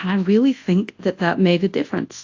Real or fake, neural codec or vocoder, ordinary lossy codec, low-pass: fake; codec, 24 kHz, 0.9 kbps, WavTokenizer, large speech release; AAC, 48 kbps; 7.2 kHz